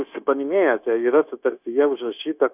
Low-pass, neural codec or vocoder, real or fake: 3.6 kHz; codec, 16 kHz, 0.9 kbps, LongCat-Audio-Codec; fake